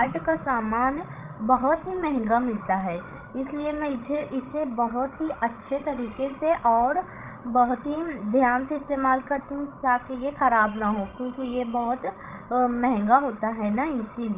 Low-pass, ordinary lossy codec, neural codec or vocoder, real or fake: 3.6 kHz; Opus, 24 kbps; codec, 16 kHz, 16 kbps, FreqCodec, larger model; fake